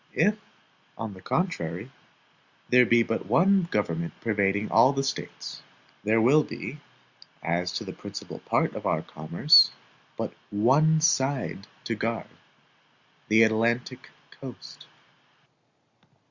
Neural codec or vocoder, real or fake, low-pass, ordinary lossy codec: none; real; 7.2 kHz; Opus, 64 kbps